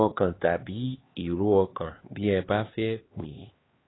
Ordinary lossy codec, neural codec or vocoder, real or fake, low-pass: AAC, 16 kbps; codec, 16 kHz, 2 kbps, X-Codec, HuBERT features, trained on LibriSpeech; fake; 7.2 kHz